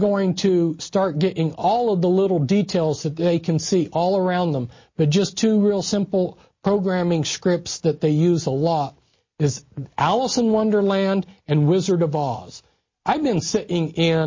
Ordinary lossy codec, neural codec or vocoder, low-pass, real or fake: MP3, 32 kbps; none; 7.2 kHz; real